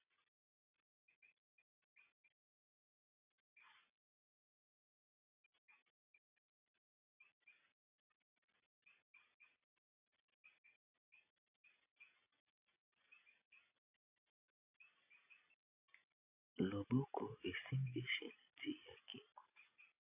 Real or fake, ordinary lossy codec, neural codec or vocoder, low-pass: real; AAC, 32 kbps; none; 3.6 kHz